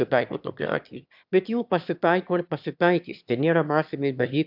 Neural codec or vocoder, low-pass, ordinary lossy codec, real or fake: autoencoder, 22.05 kHz, a latent of 192 numbers a frame, VITS, trained on one speaker; 5.4 kHz; AAC, 48 kbps; fake